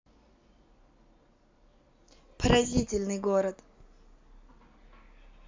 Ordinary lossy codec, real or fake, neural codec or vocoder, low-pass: MP3, 64 kbps; real; none; 7.2 kHz